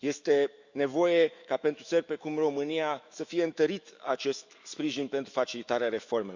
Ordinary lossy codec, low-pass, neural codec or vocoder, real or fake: Opus, 64 kbps; 7.2 kHz; autoencoder, 48 kHz, 128 numbers a frame, DAC-VAE, trained on Japanese speech; fake